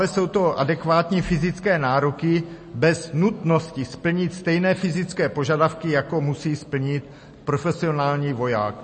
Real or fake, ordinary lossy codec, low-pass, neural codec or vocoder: real; MP3, 32 kbps; 10.8 kHz; none